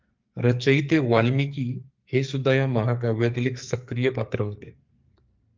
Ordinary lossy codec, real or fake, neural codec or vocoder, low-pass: Opus, 24 kbps; fake; codec, 44.1 kHz, 2.6 kbps, SNAC; 7.2 kHz